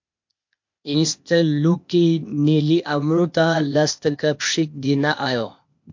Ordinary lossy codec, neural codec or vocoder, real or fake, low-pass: MP3, 48 kbps; codec, 16 kHz, 0.8 kbps, ZipCodec; fake; 7.2 kHz